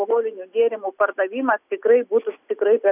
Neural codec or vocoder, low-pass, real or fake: none; 3.6 kHz; real